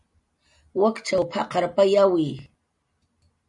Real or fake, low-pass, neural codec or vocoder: real; 10.8 kHz; none